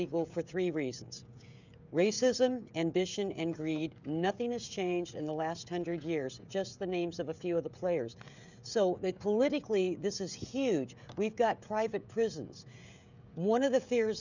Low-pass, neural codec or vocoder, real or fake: 7.2 kHz; codec, 16 kHz, 16 kbps, FreqCodec, smaller model; fake